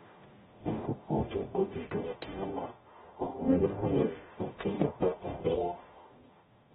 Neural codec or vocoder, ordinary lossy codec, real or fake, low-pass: codec, 44.1 kHz, 0.9 kbps, DAC; AAC, 16 kbps; fake; 19.8 kHz